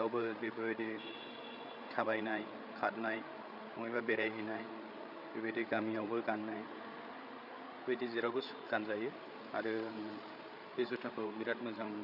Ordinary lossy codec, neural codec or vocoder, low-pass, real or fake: none; codec, 16 kHz, 8 kbps, FreqCodec, larger model; 5.4 kHz; fake